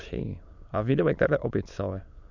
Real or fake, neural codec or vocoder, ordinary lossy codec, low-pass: fake; autoencoder, 22.05 kHz, a latent of 192 numbers a frame, VITS, trained on many speakers; none; 7.2 kHz